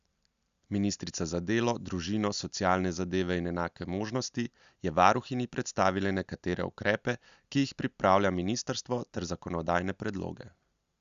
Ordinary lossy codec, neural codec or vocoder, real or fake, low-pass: Opus, 64 kbps; none; real; 7.2 kHz